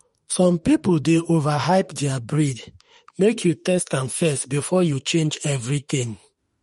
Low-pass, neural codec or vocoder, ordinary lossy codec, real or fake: 19.8 kHz; autoencoder, 48 kHz, 32 numbers a frame, DAC-VAE, trained on Japanese speech; MP3, 48 kbps; fake